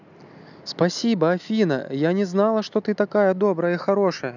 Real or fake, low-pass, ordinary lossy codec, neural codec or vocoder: real; 7.2 kHz; none; none